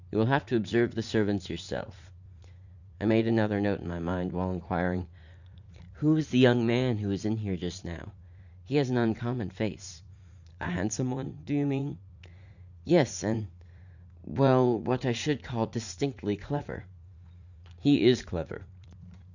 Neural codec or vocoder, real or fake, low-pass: vocoder, 44.1 kHz, 80 mel bands, Vocos; fake; 7.2 kHz